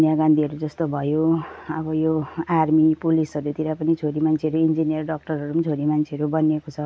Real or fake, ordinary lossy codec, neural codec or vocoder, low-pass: real; none; none; none